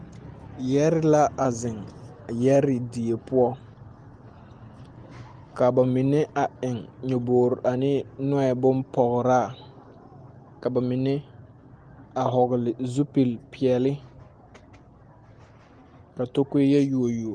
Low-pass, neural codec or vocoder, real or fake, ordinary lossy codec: 9.9 kHz; none; real; Opus, 24 kbps